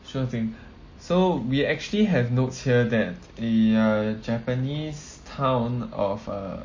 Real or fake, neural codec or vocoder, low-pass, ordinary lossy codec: real; none; 7.2 kHz; MP3, 32 kbps